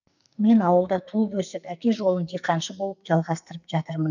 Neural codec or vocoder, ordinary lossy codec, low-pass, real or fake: codec, 44.1 kHz, 2.6 kbps, SNAC; none; 7.2 kHz; fake